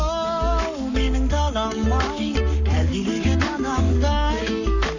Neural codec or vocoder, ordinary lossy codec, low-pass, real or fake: codec, 44.1 kHz, 7.8 kbps, Pupu-Codec; none; 7.2 kHz; fake